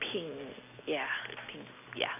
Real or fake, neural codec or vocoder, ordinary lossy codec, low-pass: real; none; AAC, 32 kbps; 3.6 kHz